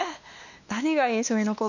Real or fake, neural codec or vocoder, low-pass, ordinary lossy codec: fake; codec, 16 kHz, 2 kbps, X-Codec, WavLM features, trained on Multilingual LibriSpeech; 7.2 kHz; none